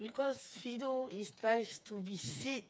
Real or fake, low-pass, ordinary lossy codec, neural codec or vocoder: fake; none; none; codec, 16 kHz, 4 kbps, FreqCodec, smaller model